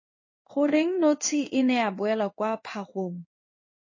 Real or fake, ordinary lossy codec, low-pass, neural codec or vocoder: fake; MP3, 32 kbps; 7.2 kHz; codec, 16 kHz in and 24 kHz out, 1 kbps, XY-Tokenizer